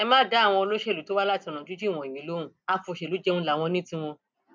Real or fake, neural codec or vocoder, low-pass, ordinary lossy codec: real; none; none; none